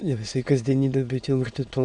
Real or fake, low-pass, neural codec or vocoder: fake; 9.9 kHz; autoencoder, 22.05 kHz, a latent of 192 numbers a frame, VITS, trained on many speakers